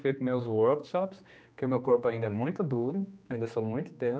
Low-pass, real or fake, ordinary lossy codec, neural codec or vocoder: none; fake; none; codec, 16 kHz, 2 kbps, X-Codec, HuBERT features, trained on general audio